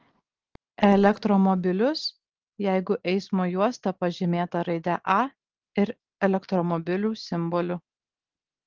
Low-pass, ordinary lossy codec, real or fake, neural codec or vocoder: 7.2 kHz; Opus, 16 kbps; real; none